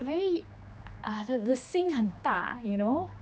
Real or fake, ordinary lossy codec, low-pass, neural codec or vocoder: fake; none; none; codec, 16 kHz, 2 kbps, X-Codec, HuBERT features, trained on general audio